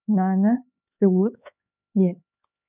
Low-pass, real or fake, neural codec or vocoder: 3.6 kHz; fake; codec, 16 kHz, 4 kbps, X-Codec, HuBERT features, trained on LibriSpeech